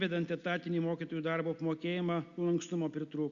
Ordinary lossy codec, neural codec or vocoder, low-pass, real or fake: Opus, 64 kbps; none; 7.2 kHz; real